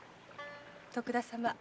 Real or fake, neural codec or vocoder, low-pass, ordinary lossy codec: real; none; none; none